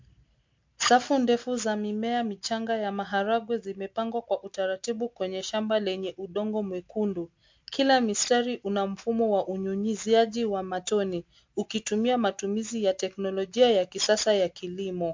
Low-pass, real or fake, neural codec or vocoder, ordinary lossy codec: 7.2 kHz; real; none; MP3, 48 kbps